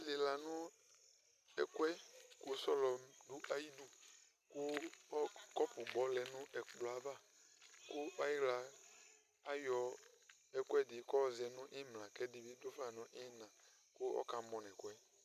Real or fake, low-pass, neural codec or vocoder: real; 14.4 kHz; none